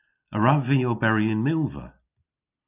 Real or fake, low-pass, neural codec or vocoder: real; 3.6 kHz; none